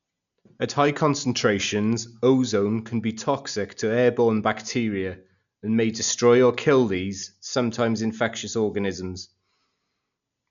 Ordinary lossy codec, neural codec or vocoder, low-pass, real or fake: none; none; 7.2 kHz; real